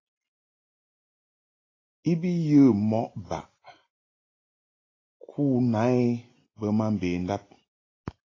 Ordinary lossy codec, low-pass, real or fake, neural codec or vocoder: AAC, 32 kbps; 7.2 kHz; real; none